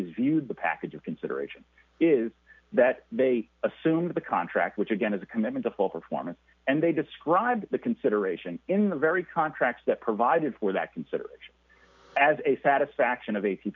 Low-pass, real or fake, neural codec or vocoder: 7.2 kHz; real; none